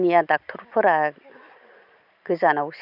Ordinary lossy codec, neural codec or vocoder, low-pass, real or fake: none; none; 5.4 kHz; real